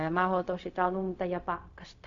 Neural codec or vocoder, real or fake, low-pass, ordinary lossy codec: codec, 16 kHz, 0.4 kbps, LongCat-Audio-Codec; fake; 7.2 kHz; AAC, 64 kbps